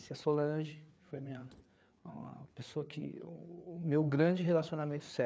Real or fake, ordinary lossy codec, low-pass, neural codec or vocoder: fake; none; none; codec, 16 kHz, 4 kbps, FreqCodec, larger model